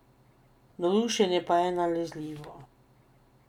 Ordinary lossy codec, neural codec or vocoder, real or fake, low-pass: none; none; real; 19.8 kHz